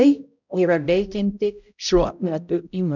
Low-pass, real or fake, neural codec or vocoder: 7.2 kHz; fake; codec, 16 kHz, 0.5 kbps, X-Codec, HuBERT features, trained on balanced general audio